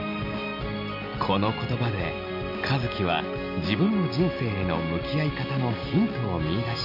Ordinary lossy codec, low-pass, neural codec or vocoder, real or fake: none; 5.4 kHz; none; real